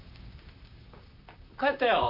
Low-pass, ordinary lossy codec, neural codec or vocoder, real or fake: 5.4 kHz; AAC, 48 kbps; vocoder, 44.1 kHz, 128 mel bands, Pupu-Vocoder; fake